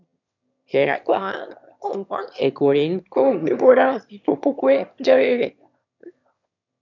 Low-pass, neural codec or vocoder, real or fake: 7.2 kHz; autoencoder, 22.05 kHz, a latent of 192 numbers a frame, VITS, trained on one speaker; fake